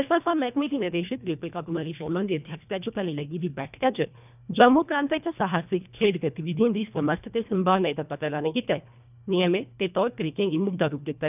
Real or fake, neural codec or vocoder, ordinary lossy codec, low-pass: fake; codec, 24 kHz, 1.5 kbps, HILCodec; none; 3.6 kHz